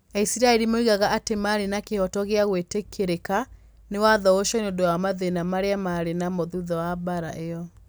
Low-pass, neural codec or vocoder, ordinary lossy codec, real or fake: none; none; none; real